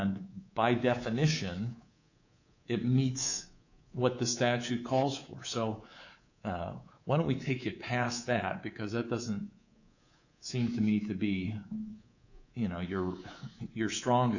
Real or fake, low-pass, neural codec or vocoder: fake; 7.2 kHz; codec, 24 kHz, 3.1 kbps, DualCodec